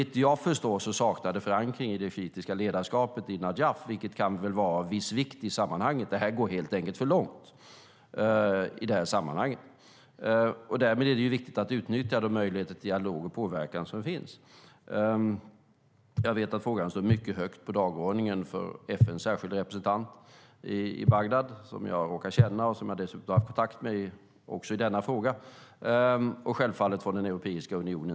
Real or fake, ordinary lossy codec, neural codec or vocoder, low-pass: real; none; none; none